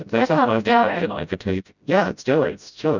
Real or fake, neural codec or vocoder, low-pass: fake; codec, 16 kHz, 0.5 kbps, FreqCodec, smaller model; 7.2 kHz